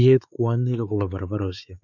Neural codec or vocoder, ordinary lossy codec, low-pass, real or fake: codec, 16 kHz, 4 kbps, X-Codec, WavLM features, trained on Multilingual LibriSpeech; none; 7.2 kHz; fake